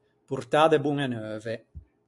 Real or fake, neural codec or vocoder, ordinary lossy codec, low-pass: real; none; AAC, 64 kbps; 10.8 kHz